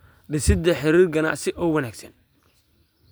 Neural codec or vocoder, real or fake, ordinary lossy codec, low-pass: none; real; none; none